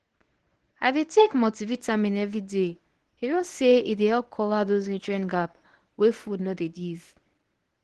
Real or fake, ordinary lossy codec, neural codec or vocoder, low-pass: fake; Opus, 16 kbps; codec, 24 kHz, 0.9 kbps, WavTokenizer, medium speech release version 1; 10.8 kHz